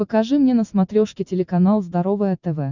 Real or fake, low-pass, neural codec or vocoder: real; 7.2 kHz; none